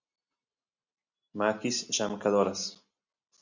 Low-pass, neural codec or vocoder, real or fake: 7.2 kHz; none; real